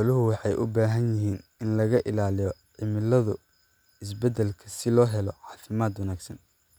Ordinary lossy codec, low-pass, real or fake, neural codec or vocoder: none; none; real; none